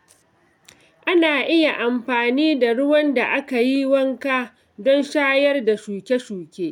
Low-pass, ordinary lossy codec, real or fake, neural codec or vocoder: 19.8 kHz; none; real; none